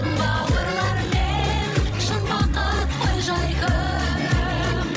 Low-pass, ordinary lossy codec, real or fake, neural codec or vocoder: none; none; fake; codec, 16 kHz, 16 kbps, FreqCodec, larger model